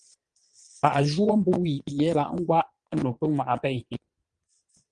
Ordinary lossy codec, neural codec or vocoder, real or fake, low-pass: Opus, 24 kbps; vocoder, 22.05 kHz, 80 mel bands, Vocos; fake; 9.9 kHz